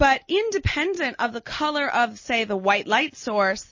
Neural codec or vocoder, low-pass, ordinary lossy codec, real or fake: none; 7.2 kHz; MP3, 32 kbps; real